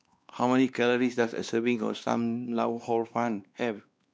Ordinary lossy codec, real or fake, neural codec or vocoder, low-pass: none; fake; codec, 16 kHz, 2 kbps, X-Codec, WavLM features, trained on Multilingual LibriSpeech; none